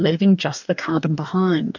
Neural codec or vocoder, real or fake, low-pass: codec, 44.1 kHz, 2.6 kbps, DAC; fake; 7.2 kHz